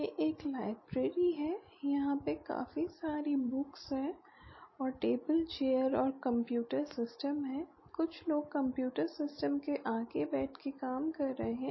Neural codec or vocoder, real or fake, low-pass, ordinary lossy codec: none; real; 7.2 kHz; MP3, 24 kbps